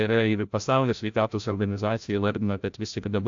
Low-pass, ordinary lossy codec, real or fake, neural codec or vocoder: 7.2 kHz; AAC, 64 kbps; fake; codec, 16 kHz, 0.5 kbps, FreqCodec, larger model